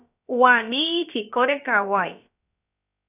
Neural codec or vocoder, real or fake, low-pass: codec, 16 kHz, about 1 kbps, DyCAST, with the encoder's durations; fake; 3.6 kHz